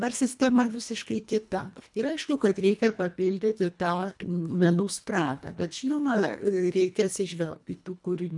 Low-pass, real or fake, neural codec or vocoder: 10.8 kHz; fake; codec, 24 kHz, 1.5 kbps, HILCodec